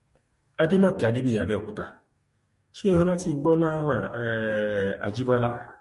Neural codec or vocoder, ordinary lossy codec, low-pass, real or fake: codec, 44.1 kHz, 2.6 kbps, DAC; MP3, 48 kbps; 14.4 kHz; fake